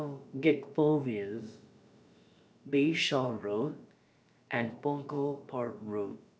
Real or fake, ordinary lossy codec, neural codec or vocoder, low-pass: fake; none; codec, 16 kHz, about 1 kbps, DyCAST, with the encoder's durations; none